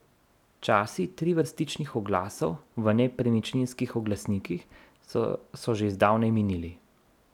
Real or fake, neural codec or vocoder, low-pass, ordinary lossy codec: real; none; 19.8 kHz; none